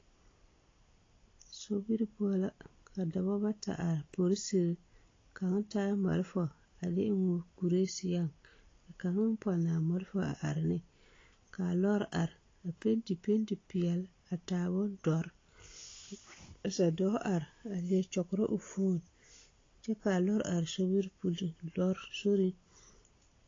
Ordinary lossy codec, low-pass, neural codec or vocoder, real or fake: AAC, 32 kbps; 7.2 kHz; none; real